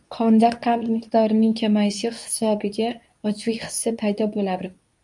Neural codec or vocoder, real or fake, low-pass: codec, 24 kHz, 0.9 kbps, WavTokenizer, medium speech release version 2; fake; 10.8 kHz